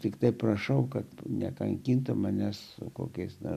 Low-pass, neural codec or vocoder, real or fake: 14.4 kHz; none; real